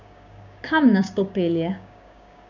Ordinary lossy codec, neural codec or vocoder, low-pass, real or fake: none; codec, 16 kHz, 6 kbps, DAC; 7.2 kHz; fake